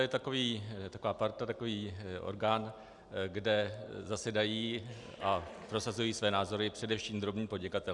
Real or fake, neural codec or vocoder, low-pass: real; none; 10.8 kHz